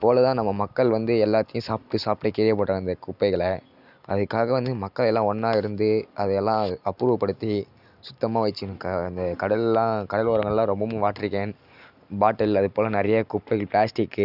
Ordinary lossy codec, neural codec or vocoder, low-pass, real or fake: none; none; 5.4 kHz; real